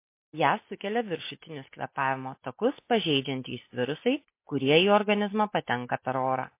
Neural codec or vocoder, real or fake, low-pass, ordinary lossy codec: none; real; 3.6 kHz; MP3, 24 kbps